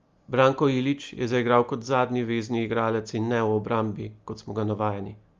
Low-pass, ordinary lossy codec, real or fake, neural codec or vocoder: 7.2 kHz; Opus, 32 kbps; real; none